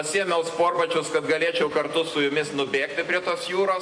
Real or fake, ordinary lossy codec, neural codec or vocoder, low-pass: real; MP3, 48 kbps; none; 9.9 kHz